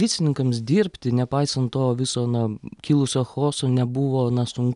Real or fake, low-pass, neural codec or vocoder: real; 10.8 kHz; none